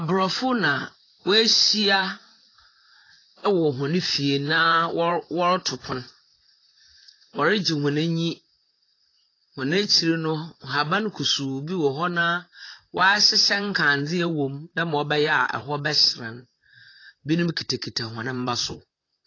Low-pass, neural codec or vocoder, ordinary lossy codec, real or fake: 7.2 kHz; vocoder, 44.1 kHz, 128 mel bands, Pupu-Vocoder; AAC, 32 kbps; fake